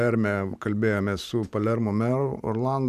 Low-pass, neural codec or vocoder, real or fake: 14.4 kHz; vocoder, 44.1 kHz, 128 mel bands every 512 samples, BigVGAN v2; fake